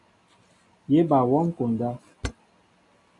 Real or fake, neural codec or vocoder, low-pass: real; none; 10.8 kHz